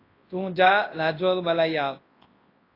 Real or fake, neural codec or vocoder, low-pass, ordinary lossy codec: fake; codec, 24 kHz, 0.9 kbps, WavTokenizer, large speech release; 5.4 kHz; AAC, 24 kbps